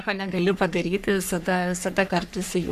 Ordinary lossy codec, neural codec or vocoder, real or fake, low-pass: AAC, 96 kbps; codec, 44.1 kHz, 3.4 kbps, Pupu-Codec; fake; 14.4 kHz